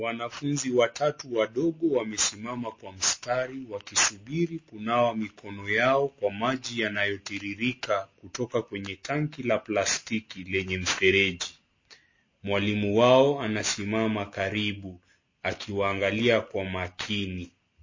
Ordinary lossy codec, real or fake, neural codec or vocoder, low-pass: MP3, 32 kbps; real; none; 7.2 kHz